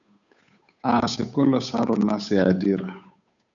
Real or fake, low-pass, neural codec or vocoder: fake; 7.2 kHz; codec, 16 kHz, 8 kbps, FunCodec, trained on Chinese and English, 25 frames a second